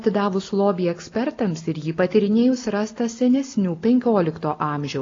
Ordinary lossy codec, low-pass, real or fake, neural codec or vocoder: AAC, 32 kbps; 7.2 kHz; real; none